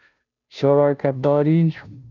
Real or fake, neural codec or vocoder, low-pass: fake; codec, 16 kHz, 0.5 kbps, FunCodec, trained on Chinese and English, 25 frames a second; 7.2 kHz